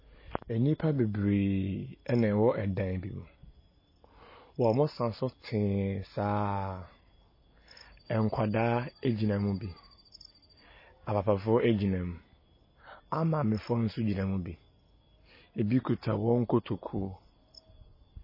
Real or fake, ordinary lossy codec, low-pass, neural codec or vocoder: real; MP3, 24 kbps; 5.4 kHz; none